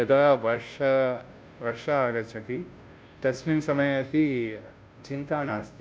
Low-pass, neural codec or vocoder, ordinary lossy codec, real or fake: none; codec, 16 kHz, 0.5 kbps, FunCodec, trained on Chinese and English, 25 frames a second; none; fake